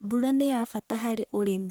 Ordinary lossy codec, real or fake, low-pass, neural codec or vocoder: none; fake; none; codec, 44.1 kHz, 1.7 kbps, Pupu-Codec